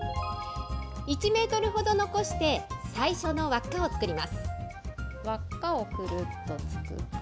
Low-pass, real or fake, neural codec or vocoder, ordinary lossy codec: none; real; none; none